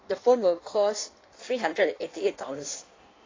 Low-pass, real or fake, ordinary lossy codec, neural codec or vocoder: 7.2 kHz; fake; AAC, 32 kbps; codec, 16 kHz in and 24 kHz out, 1.1 kbps, FireRedTTS-2 codec